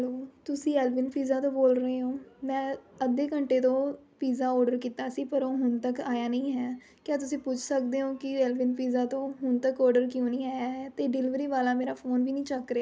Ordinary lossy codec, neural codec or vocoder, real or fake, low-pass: none; none; real; none